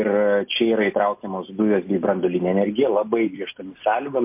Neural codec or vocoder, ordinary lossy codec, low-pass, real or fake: none; MP3, 32 kbps; 3.6 kHz; real